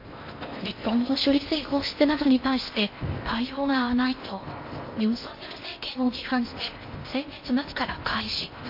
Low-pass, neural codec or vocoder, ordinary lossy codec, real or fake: 5.4 kHz; codec, 16 kHz in and 24 kHz out, 0.6 kbps, FocalCodec, streaming, 4096 codes; MP3, 32 kbps; fake